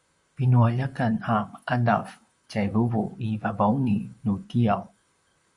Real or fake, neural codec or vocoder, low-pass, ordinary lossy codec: fake; vocoder, 44.1 kHz, 128 mel bands, Pupu-Vocoder; 10.8 kHz; Opus, 64 kbps